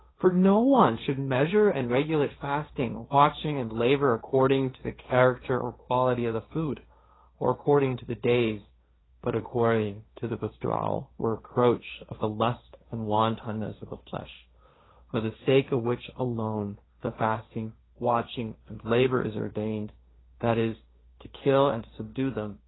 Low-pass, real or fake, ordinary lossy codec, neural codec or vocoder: 7.2 kHz; fake; AAC, 16 kbps; codec, 16 kHz, 1.1 kbps, Voila-Tokenizer